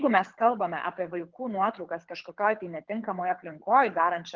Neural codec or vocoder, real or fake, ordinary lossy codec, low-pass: codec, 24 kHz, 6 kbps, HILCodec; fake; Opus, 32 kbps; 7.2 kHz